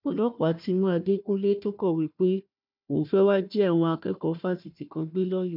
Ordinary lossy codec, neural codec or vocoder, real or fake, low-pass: none; codec, 16 kHz, 1 kbps, FunCodec, trained on Chinese and English, 50 frames a second; fake; 5.4 kHz